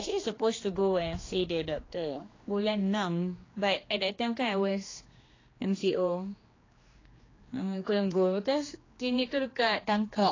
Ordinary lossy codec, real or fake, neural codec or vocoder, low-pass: AAC, 32 kbps; fake; codec, 16 kHz, 2 kbps, X-Codec, HuBERT features, trained on general audio; 7.2 kHz